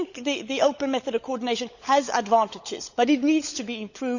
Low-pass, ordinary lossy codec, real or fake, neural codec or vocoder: 7.2 kHz; none; fake; codec, 16 kHz, 16 kbps, FunCodec, trained on LibriTTS, 50 frames a second